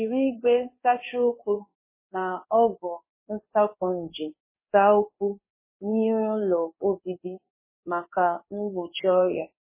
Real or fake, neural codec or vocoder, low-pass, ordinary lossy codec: fake; codec, 16 kHz in and 24 kHz out, 1 kbps, XY-Tokenizer; 3.6 kHz; MP3, 16 kbps